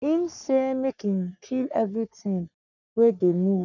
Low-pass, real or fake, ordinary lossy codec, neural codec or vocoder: 7.2 kHz; fake; none; codec, 44.1 kHz, 3.4 kbps, Pupu-Codec